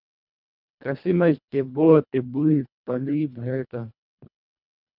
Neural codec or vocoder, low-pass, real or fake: codec, 24 kHz, 1.5 kbps, HILCodec; 5.4 kHz; fake